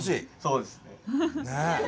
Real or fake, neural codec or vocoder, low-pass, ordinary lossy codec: real; none; none; none